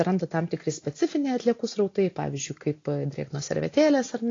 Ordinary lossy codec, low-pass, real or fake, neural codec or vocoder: AAC, 32 kbps; 7.2 kHz; real; none